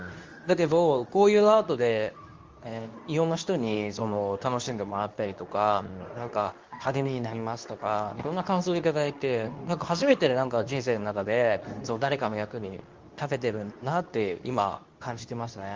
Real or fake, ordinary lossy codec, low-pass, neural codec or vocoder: fake; Opus, 32 kbps; 7.2 kHz; codec, 24 kHz, 0.9 kbps, WavTokenizer, medium speech release version 2